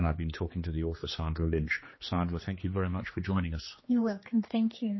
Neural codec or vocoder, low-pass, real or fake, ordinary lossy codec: codec, 16 kHz, 2 kbps, X-Codec, HuBERT features, trained on general audio; 7.2 kHz; fake; MP3, 24 kbps